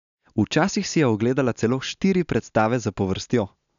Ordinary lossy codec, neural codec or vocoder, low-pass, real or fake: none; none; 7.2 kHz; real